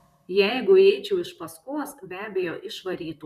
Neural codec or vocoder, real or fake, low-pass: vocoder, 44.1 kHz, 128 mel bands, Pupu-Vocoder; fake; 14.4 kHz